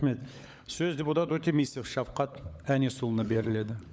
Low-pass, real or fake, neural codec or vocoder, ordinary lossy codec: none; fake; codec, 16 kHz, 16 kbps, FunCodec, trained on LibriTTS, 50 frames a second; none